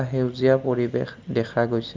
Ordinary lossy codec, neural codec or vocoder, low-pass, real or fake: Opus, 24 kbps; none; 7.2 kHz; real